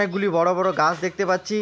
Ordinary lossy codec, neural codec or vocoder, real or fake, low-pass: none; none; real; none